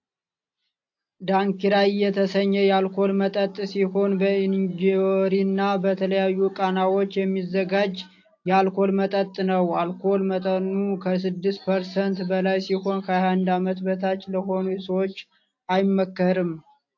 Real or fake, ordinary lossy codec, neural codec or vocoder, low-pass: real; AAC, 48 kbps; none; 7.2 kHz